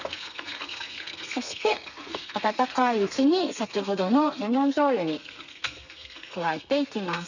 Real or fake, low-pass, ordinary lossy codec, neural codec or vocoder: fake; 7.2 kHz; none; codec, 32 kHz, 1.9 kbps, SNAC